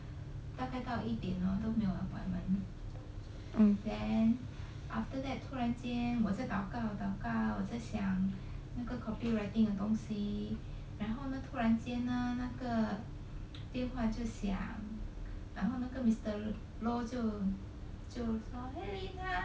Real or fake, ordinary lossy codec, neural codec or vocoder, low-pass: real; none; none; none